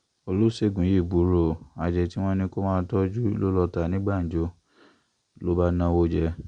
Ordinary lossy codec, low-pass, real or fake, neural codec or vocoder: Opus, 64 kbps; 9.9 kHz; real; none